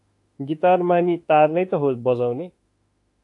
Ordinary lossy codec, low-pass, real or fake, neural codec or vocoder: AAC, 48 kbps; 10.8 kHz; fake; autoencoder, 48 kHz, 32 numbers a frame, DAC-VAE, trained on Japanese speech